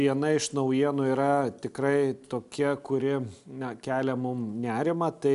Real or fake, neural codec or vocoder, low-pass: real; none; 10.8 kHz